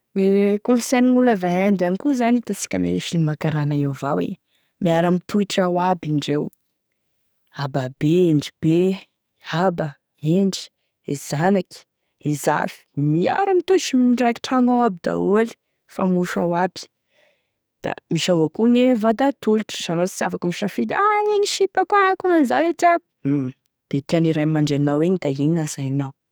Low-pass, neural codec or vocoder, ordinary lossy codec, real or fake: none; codec, 44.1 kHz, 2.6 kbps, SNAC; none; fake